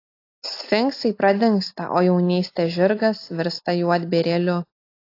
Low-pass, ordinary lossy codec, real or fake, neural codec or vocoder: 5.4 kHz; AAC, 32 kbps; real; none